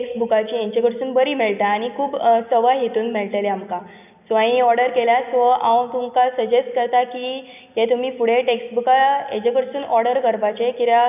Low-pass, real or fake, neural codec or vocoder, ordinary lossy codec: 3.6 kHz; real; none; none